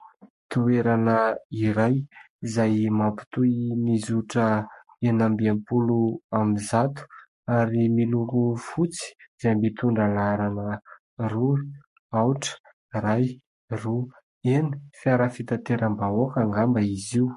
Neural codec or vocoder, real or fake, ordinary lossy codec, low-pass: codec, 44.1 kHz, 7.8 kbps, Pupu-Codec; fake; MP3, 48 kbps; 14.4 kHz